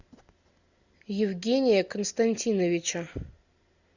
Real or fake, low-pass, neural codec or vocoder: real; 7.2 kHz; none